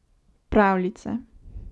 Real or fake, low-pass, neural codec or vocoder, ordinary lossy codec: real; none; none; none